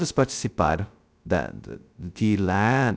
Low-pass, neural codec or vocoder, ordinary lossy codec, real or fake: none; codec, 16 kHz, 0.2 kbps, FocalCodec; none; fake